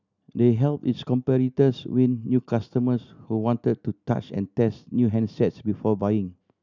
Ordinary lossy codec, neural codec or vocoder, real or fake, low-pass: none; none; real; 7.2 kHz